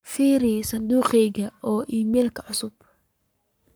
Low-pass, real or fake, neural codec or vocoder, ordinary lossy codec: none; fake; codec, 44.1 kHz, 7.8 kbps, Pupu-Codec; none